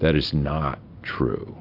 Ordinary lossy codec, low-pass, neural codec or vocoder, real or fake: AAC, 32 kbps; 5.4 kHz; none; real